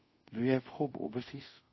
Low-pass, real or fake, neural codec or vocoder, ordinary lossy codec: 7.2 kHz; fake; codec, 24 kHz, 0.5 kbps, DualCodec; MP3, 24 kbps